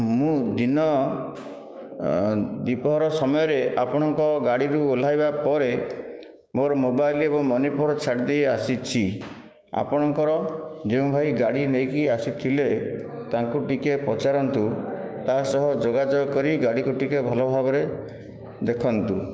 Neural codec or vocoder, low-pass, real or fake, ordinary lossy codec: codec, 16 kHz, 6 kbps, DAC; none; fake; none